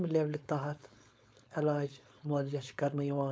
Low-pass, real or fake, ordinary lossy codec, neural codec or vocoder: none; fake; none; codec, 16 kHz, 4.8 kbps, FACodec